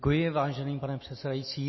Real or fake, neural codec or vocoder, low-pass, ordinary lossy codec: real; none; 7.2 kHz; MP3, 24 kbps